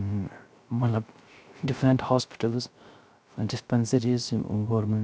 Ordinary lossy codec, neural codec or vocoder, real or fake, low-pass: none; codec, 16 kHz, 0.3 kbps, FocalCodec; fake; none